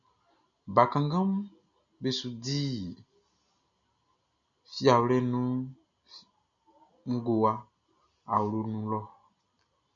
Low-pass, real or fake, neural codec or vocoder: 7.2 kHz; real; none